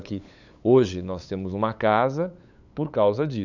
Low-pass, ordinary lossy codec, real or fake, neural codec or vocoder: 7.2 kHz; none; fake; codec, 16 kHz, 8 kbps, FunCodec, trained on LibriTTS, 25 frames a second